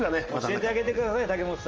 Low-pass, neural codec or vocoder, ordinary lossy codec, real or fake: 7.2 kHz; none; Opus, 24 kbps; real